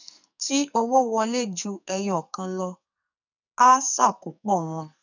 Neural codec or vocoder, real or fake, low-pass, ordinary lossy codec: codec, 44.1 kHz, 2.6 kbps, SNAC; fake; 7.2 kHz; none